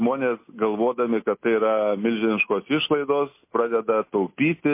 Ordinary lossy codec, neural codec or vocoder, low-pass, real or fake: MP3, 24 kbps; none; 3.6 kHz; real